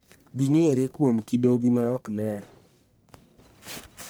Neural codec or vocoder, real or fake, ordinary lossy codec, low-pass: codec, 44.1 kHz, 1.7 kbps, Pupu-Codec; fake; none; none